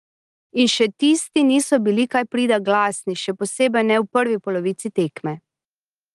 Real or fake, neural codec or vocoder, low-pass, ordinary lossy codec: real; none; 10.8 kHz; Opus, 24 kbps